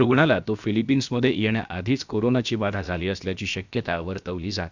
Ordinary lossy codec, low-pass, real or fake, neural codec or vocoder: none; 7.2 kHz; fake; codec, 16 kHz, about 1 kbps, DyCAST, with the encoder's durations